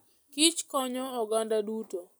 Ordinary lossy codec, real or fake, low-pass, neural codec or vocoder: none; real; none; none